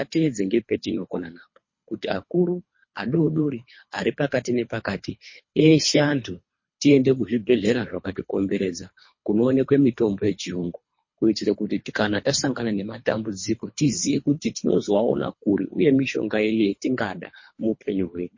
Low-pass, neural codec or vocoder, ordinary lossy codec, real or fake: 7.2 kHz; codec, 24 kHz, 3 kbps, HILCodec; MP3, 32 kbps; fake